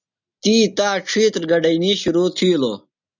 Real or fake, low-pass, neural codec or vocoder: real; 7.2 kHz; none